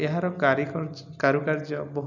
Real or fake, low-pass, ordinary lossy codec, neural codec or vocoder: real; 7.2 kHz; none; none